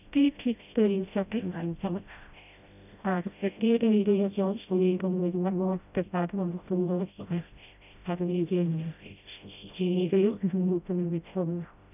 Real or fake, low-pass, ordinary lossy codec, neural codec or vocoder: fake; 3.6 kHz; none; codec, 16 kHz, 0.5 kbps, FreqCodec, smaller model